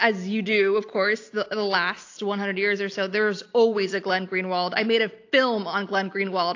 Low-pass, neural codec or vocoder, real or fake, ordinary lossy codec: 7.2 kHz; none; real; AAC, 48 kbps